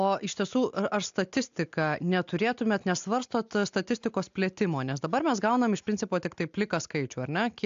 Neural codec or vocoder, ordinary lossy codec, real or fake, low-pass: none; AAC, 64 kbps; real; 7.2 kHz